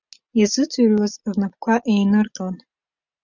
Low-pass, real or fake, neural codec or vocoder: 7.2 kHz; real; none